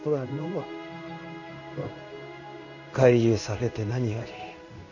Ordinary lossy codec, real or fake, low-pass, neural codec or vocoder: none; fake; 7.2 kHz; codec, 16 kHz in and 24 kHz out, 1 kbps, XY-Tokenizer